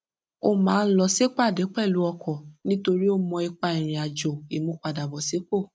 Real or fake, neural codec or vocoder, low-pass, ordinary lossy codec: real; none; none; none